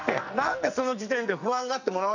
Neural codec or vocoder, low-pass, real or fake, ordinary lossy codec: codec, 44.1 kHz, 2.6 kbps, SNAC; 7.2 kHz; fake; none